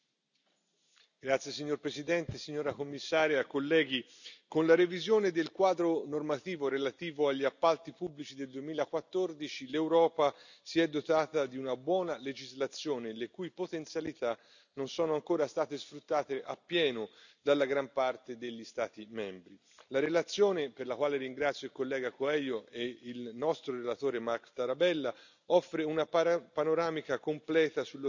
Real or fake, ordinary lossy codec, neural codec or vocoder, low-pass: real; none; none; 7.2 kHz